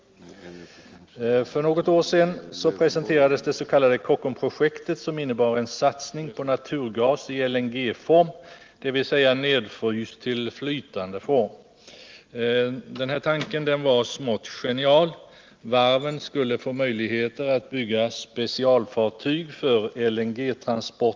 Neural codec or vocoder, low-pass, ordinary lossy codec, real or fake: none; 7.2 kHz; Opus, 32 kbps; real